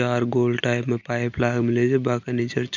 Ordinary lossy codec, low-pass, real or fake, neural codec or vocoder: none; 7.2 kHz; real; none